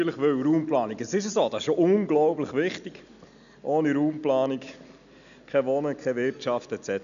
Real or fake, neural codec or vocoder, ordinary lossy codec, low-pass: real; none; none; 7.2 kHz